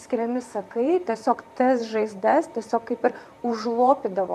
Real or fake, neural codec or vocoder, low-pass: fake; vocoder, 44.1 kHz, 128 mel bands, Pupu-Vocoder; 14.4 kHz